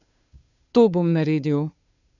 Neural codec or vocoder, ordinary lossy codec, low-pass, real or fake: codec, 16 kHz, 2 kbps, FunCodec, trained on Chinese and English, 25 frames a second; none; 7.2 kHz; fake